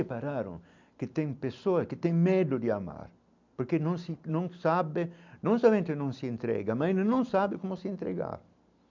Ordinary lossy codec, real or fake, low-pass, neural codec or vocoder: MP3, 64 kbps; real; 7.2 kHz; none